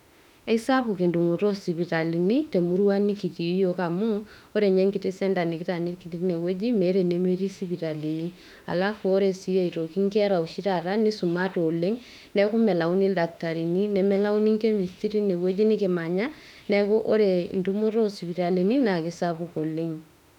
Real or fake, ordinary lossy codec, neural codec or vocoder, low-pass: fake; none; autoencoder, 48 kHz, 32 numbers a frame, DAC-VAE, trained on Japanese speech; 19.8 kHz